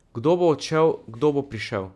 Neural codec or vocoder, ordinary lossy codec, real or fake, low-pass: none; none; real; none